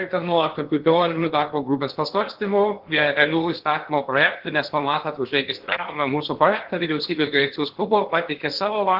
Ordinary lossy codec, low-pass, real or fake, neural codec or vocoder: Opus, 16 kbps; 5.4 kHz; fake; codec, 16 kHz in and 24 kHz out, 0.8 kbps, FocalCodec, streaming, 65536 codes